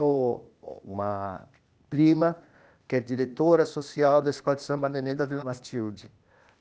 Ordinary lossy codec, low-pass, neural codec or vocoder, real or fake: none; none; codec, 16 kHz, 0.8 kbps, ZipCodec; fake